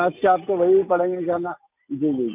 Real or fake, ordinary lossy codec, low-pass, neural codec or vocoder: real; none; 3.6 kHz; none